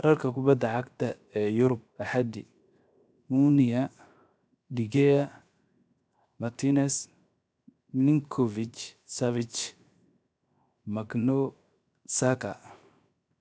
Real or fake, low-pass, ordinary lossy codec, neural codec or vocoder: fake; none; none; codec, 16 kHz, 0.7 kbps, FocalCodec